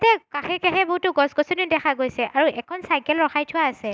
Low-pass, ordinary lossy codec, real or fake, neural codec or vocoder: none; none; real; none